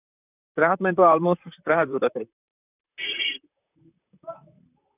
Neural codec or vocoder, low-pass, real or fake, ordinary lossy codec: vocoder, 44.1 kHz, 128 mel bands, Pupu-Vocoder; 3.6 kHz; fake; none